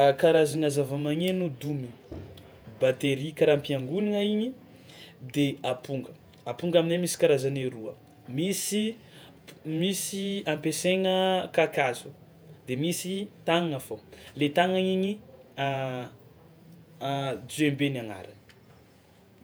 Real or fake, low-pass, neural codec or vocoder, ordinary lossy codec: fake; none; vocoder, 48 kHz, 128 mel bands, Vocos; none